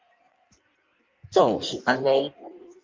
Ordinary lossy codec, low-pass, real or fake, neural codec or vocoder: Opus, 24 kbps; 7.2 kHz; fake; codec, 16 kHz in and 24 kHz out, 1.1 kbps, FireRedTTS-2 codec